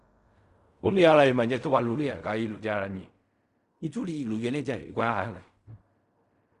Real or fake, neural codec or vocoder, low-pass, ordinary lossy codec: fake; codec, 16 kHz in and 24 kHz out, 0.4 kbps, LongCat-Audio-Codec, fine tuned four codebook decoder; 10.8 kHz; Opus, 64 kbps